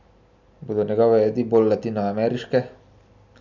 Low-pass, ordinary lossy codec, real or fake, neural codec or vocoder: 7.2 kHz; none; real; none